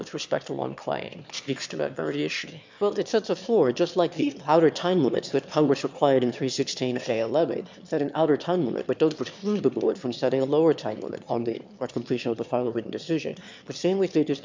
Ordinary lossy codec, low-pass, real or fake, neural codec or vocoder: MP3, 64 kbps; 7.2 kHz; fake; autoencoder, 22.05 kHz, a latent of 192 numbers a frame, VITS, trained on one speaker